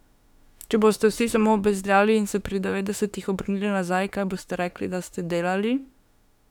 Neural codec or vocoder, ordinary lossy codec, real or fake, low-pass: autoencoder, 48 kHz, 32 numbers a frame, DAC-VAE, trained on Japanese speech; none; fake; 19.8 kHz